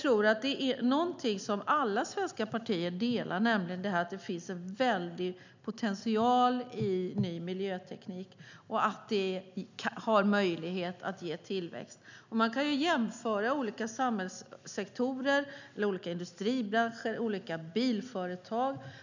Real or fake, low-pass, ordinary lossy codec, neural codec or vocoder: real; 7.2 kHz; none; none